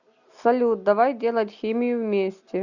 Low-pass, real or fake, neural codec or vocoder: 7.2 kHz; real; none